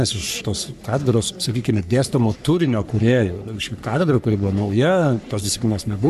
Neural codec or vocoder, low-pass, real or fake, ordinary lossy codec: codec, 44.1 kHz, 3.4 kbps, Pupu-Codec; 14.4 kHz; fake; MP3, 96 kbps